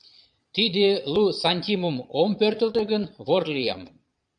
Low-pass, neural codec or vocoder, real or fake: 9.9 kHz; vocoder, 22.05 kHz, 80 mel bands, Vocos; fake